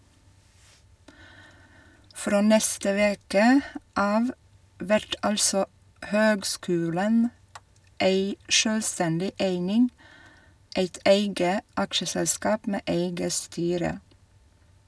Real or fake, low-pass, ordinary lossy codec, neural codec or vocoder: real; none; none; none